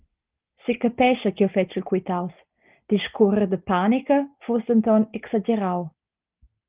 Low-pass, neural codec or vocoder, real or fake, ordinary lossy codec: 3.6 kHz; none; real; Opus, 32 kbps